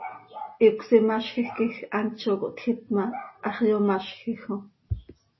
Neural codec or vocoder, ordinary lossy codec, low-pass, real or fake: none; MP3, 24 kbps; 7.2 kHz; real